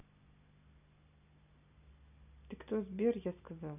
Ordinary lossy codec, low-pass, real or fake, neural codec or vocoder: none; 3.6 kHz; real; none